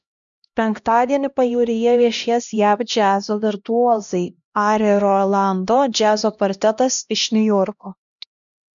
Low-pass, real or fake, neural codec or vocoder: 7.2 kHz; fake; codec, 16 kHz, 1 kbps, X-Codec, HuBERT features, trained on LibriSpeech